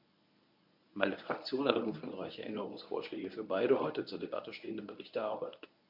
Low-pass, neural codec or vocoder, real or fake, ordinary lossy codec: 5.4 kHz; codec, 24 kHz, 0.9 kbps, WavTokenizer, medium speech release version 1; fake; none